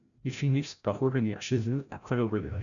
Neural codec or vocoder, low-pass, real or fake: codec, 16 kHz, 0.5 kbps, FreqCodec, larger model; 7.2 kHz; fake